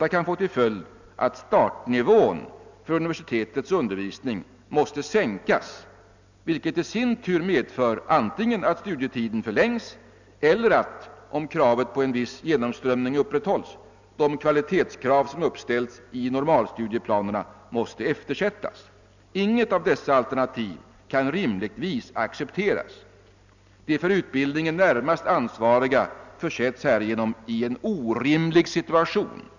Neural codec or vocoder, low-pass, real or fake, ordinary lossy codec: none; 7.2 kHz; real; none